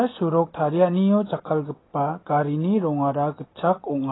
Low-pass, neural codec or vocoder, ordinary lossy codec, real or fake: 7.2 kHz; none; AAC, 16 kbps; real